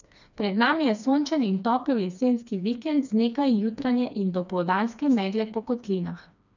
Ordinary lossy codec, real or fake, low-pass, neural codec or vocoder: none; fake; 7.2 kHz; codec, 16 kHz, 2 kbps, FreqCodec, smaller model